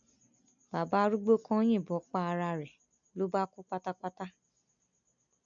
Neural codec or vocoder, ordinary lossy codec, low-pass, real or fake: none; none; 7.2 kHz; real